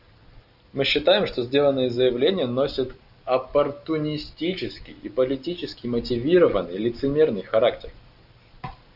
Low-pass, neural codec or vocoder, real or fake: 5.4 kHz; none; real